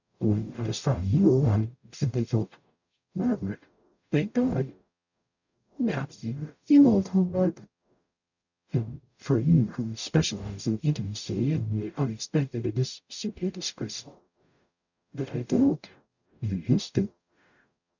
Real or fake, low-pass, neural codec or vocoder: fake; 7.2 kHz; codec, 44.1 kHz, 0.9 kbps, DAC